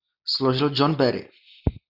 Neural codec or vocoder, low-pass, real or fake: none; 5.4 kHz; real